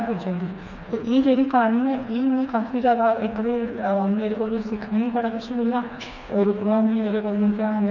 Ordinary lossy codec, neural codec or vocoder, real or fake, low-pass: none; codec, 16 kHz, 2 kbps, FreqCodec, smaller model; fake; 7.2 kHz